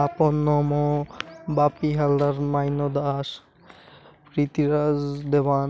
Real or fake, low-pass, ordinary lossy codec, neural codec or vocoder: real; none; none; none